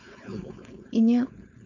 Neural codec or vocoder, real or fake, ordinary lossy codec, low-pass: codec, 16 kHz, 4.8 kbps, FACodec; fake; MP3, 64 kbps; 7.2 kHz